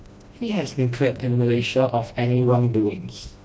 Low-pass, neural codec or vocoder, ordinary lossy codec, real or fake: none; codec, 16 kHz, 1 kbps, FreqCodec, smaller model; none; fake